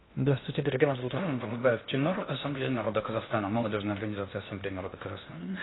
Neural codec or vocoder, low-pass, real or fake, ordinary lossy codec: codec, 16 kHz in and 24 kHz out, 0.6 kbps, FocalCodec, streaming, 2048 codes; 7.2 kHz; fake; AAC, 16 kbps